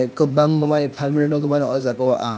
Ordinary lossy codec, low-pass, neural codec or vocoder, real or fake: none; none; codec, 16 kHz, 0.8 kbps, ZipCodec; fake